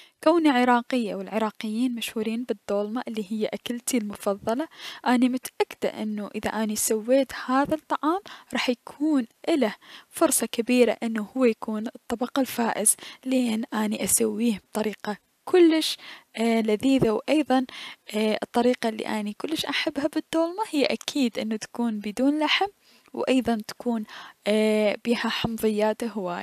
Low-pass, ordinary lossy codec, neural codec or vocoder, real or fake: 14.4 kHz; none; none; real